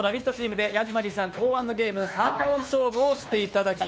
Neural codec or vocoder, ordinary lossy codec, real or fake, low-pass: codec, 16 kHz, 2 kbps, X-Codec, WavLM features, trained on Multilingual LibriSpeech; none; fake; none